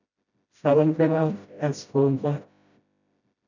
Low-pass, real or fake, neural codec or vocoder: 7.2 kHz; fake; codec, 16 kHz, 0.5 kbps, FreqCodec, smaller model